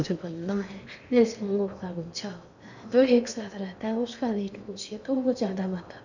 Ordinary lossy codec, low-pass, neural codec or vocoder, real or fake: none; 7.2 kHz; codec, 16 kHz in and 24 kHz out, 0.8 kbps, FocalCodec, streaming, 65536 codes; fake